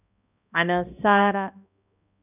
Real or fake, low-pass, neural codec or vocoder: fake; 3.6 kHz; codec, 16 kHz, 1 kbps, X-Codec, HuBERT features, trained on balanced general audio